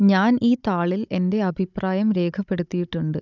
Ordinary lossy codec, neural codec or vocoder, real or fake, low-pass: none; codec, 16 kHz, 16 kbps, FunCodec, trained on Chinese and English, 50 frames a second; fake; 7.2 kHz